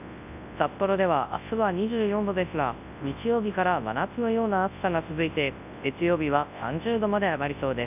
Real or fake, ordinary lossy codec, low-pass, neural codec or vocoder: fake; none; 3.6 kHz; codec, 24 kHz, 0.9 kbps, WavTokenizer, large speech release